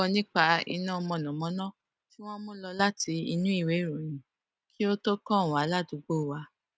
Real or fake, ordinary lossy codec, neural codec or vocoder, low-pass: real; none; none; none